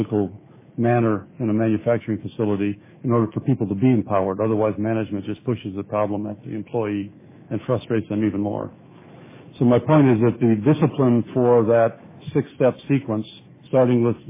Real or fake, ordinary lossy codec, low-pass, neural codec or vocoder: fake; MP3, 16 kbps; 3.6 kHz; autoencoder, 48 kHz, 128 numbers a frame, DAC-VAE, trained on Japanese speech